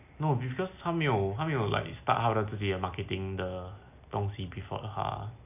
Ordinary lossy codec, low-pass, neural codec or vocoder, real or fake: none; 3.6 kHz; none; real